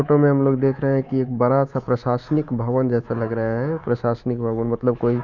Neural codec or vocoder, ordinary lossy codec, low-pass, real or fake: codec, 24 kHz, 3.1 kbps, DualCodec; none; 7.2 kHz; fake